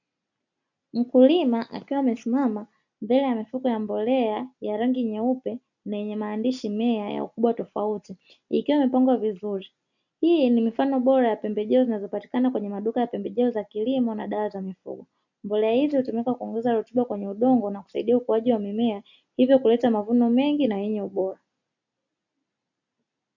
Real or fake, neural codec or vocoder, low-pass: real; none; 7.2 kHz